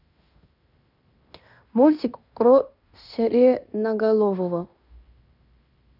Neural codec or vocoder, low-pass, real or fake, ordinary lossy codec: codec, 16 kHz in and 24 kHz out, 0.9 kbps, LongCat-Audio-Codec, fine tuned four codebook decoder; 5.4 kHz; fake; none